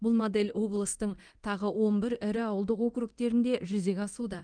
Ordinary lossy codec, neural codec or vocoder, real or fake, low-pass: Opus, 24 kbps; codec, 24 kHz, 0.9 kbps, DualCodec; fake; 9.9 kHz